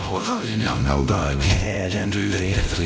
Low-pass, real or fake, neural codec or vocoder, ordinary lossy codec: none; fake; codec, 16 kHz, 0.5 kbps, X-Codec, WavLM features, trained on Multilingual LibriSpeech; none